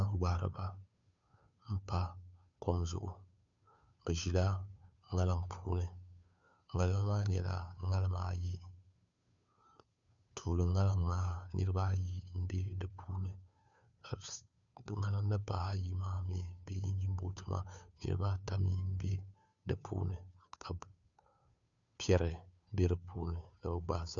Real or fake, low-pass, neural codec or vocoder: fake; 7.2 kHz; codec, 16 kHz, 2 kbps, FunCodec, trained on Chinese and English, 25 frames a second